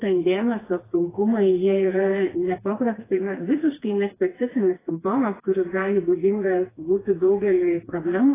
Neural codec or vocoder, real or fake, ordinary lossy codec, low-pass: codec, 16 kHz, 2 kbps, FreqCodec, smaller model; fake; AAC, 16 kbps; 3.6 kHz